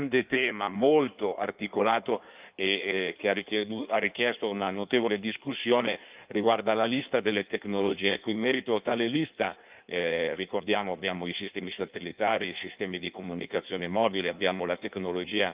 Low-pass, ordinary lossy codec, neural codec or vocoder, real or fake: 3.6 kHz; Opus, 24 kbps; codec, 16 kHz in and 24 kHz out, 1.1 kbps, FireRedTTS-2 codec; fake